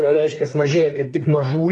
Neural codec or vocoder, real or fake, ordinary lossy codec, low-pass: codec, 24 kHz, 1 kbps, SNAC; fake; AAC, 32 kbps; 10.8 kHz